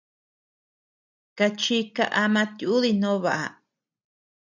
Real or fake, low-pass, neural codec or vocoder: real; 7.2 kHz; none